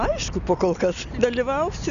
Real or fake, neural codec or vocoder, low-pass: real; none; 7.2 kHz